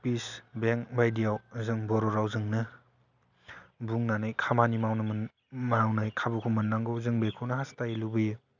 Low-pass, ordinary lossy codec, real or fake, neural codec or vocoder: 7.2 kHz; none; real; none